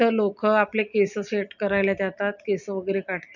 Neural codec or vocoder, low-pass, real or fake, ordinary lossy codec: none; 7.2 kHz; real; none